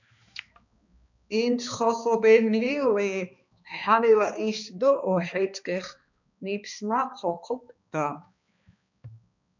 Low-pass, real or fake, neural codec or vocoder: 7.2 kHz; fake; codec, 16 kHz, 2 kbps, X-Codec, HuBERT features, trained on balanced general audio